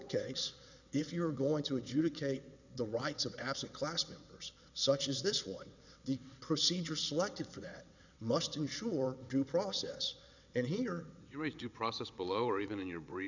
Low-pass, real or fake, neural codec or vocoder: 7.2 kHz; fake; vocoder, 22.05 kHz, 80 mel bands, WaveNeXt